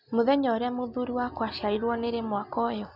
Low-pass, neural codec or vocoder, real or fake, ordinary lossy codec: 5.4 kHz; none; real; none